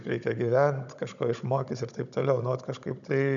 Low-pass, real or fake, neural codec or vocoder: 7.2 kHz; fake; codec, 16 kHz, 16 kbps, FunCodec, trained on Chinese and English, 50 frames a second